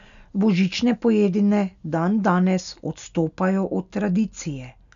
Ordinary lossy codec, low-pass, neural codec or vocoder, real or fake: none; 7.2 kHz; none; real